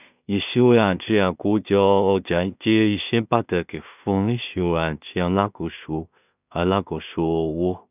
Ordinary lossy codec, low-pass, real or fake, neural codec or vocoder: none; 3.6 kHz; fake; codec, 16 kHz in and 24 kHz out, 0.4 kbps, LongCat-Audio-Codec, two codebook decoder